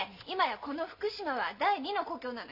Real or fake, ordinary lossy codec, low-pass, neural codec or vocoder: real; none; 5.4 kHz; none